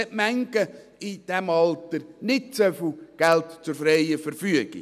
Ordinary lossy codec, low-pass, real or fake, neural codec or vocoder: none; 14.4 kHz; real; none